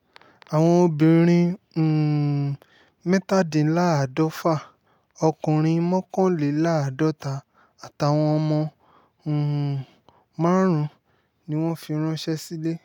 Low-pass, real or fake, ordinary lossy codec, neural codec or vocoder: 19.8 kHz; real; none; none